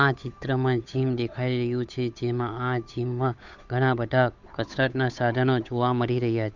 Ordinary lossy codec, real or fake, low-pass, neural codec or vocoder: none; fake; 7.2 kHz; codec, 16 kHz, 8 kbps, FunCodec, trained on Chinese and English, 25 frames a second